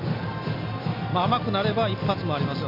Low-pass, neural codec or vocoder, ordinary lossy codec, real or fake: 5.4 kHz; none; MP3, 32 kbps; real